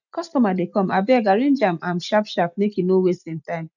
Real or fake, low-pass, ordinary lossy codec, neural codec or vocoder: real; 7.2 kHz; none; none